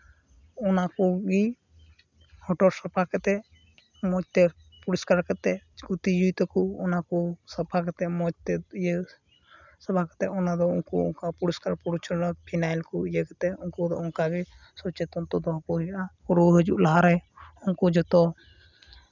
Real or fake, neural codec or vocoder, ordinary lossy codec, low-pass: real; none; none; 7.2 kHz